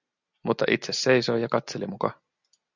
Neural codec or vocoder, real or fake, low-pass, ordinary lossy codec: none; real; 7.2 kHz; Opus, 64 kbps